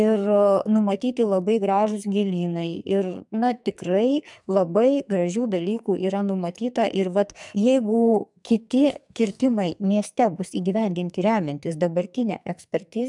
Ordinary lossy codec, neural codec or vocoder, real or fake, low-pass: MP3, 96 kbps; codec, 44.1 kHz, 2.6 kbps, SNAC; fake; 10.8 kHz